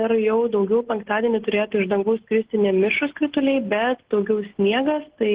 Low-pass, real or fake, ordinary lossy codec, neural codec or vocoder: 3.6 kHz; real; Opus, 16 kbps; none